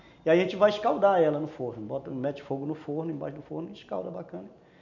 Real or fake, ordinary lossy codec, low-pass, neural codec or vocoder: real; none; 7.2 kHz; none